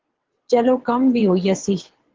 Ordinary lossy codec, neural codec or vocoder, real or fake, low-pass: Opus, 16 kbps; vocoder, 22.05 kHz, 80 mel bands, WaveNeXt; fake; 7.2 kHz